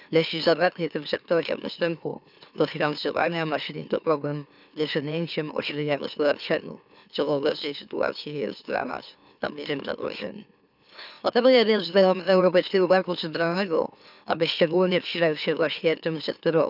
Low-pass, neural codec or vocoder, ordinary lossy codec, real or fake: 5.4 kHz; autoencoder, 44.1 kHz, a latent of 192 numbers a frame, MeloTTS; none; fake